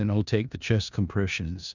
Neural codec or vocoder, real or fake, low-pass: codec, 16 kHz in and 24 kHz out, 0.4 kbps, LongCat-Audio-Codec, four codebook decoder; fake; 7.2 kHz